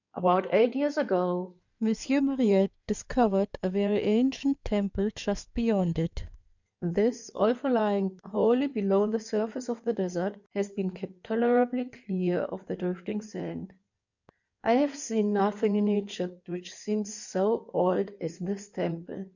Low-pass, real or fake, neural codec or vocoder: 7.2 kHz; fake; codec, 16 kHz in and 24 kHz out, 2.2 kbps, FireRedTTS-2 codec